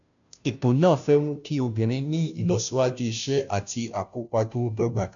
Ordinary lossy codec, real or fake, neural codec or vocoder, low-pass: none; fake; codec, 16 kHz, 0.5 kbps, FunCodec, trained on Chinese and English, 25 frames a second; 7.2 kHz